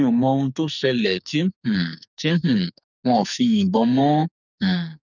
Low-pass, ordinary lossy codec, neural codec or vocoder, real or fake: 7.2 kHz; none; codec, 44.1 kHz, 2.6 kbps, SNAC; fake